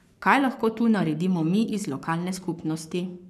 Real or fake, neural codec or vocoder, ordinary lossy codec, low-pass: fake; codec, 44.1 kHz, 7.8 kbps, Pupu-Codec; none; 14.4 kHz